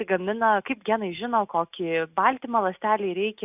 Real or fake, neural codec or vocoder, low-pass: real; none; 3.6 kHz